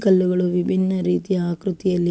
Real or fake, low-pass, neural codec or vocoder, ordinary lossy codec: real; none; none; none